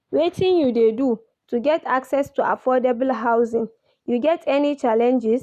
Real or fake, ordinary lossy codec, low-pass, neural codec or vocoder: real; none; 14.4 kHz; none